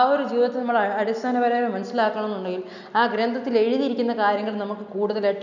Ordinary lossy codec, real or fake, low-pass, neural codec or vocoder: none; real; 7.2 kHz; none